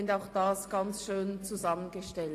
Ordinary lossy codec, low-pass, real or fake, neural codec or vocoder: AAC, 48 kbps; 14.4 kHz; real; none